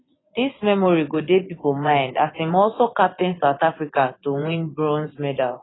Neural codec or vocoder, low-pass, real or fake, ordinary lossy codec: vocoder, 24 kHz, 100 mel bands, Vocos; 7.2 kHz; fake; AAC, 16 kbps